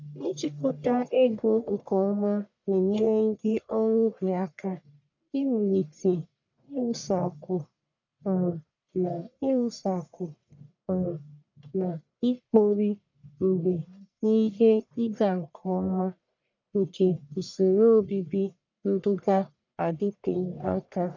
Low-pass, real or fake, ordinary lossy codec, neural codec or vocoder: 7.2 kHz; fake; none; codec, 44.1 kHz, 1.7 kbps, Pupu-Codec